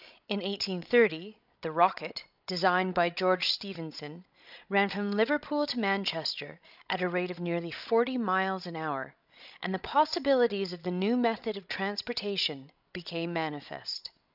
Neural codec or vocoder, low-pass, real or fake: codec, 16 kHz, 16 kbps, FreqCodec, larger model; 5.4 kHz; fake